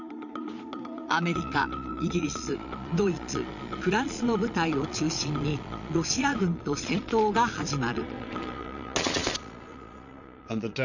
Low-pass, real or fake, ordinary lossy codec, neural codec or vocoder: 7.2 kHz; fake; none; vocoder, 22.05 kHz, 80 mel bands, Vocos